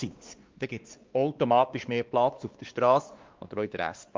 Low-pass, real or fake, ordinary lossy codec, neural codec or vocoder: 7.2 kHz; fake; Opus, 32 kbps; codec, 16 kHz, 2 kbps, X-Codec, WavLM features, trained on Multilingual LibriSpeech